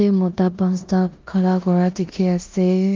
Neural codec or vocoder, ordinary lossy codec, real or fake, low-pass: codec, 16 kHz in and 24 kHz out, 0.9 kbps, LongCat-Audio-Codec, four codebook decoder; Opus, 32 kbps; fake; 7.2 kHz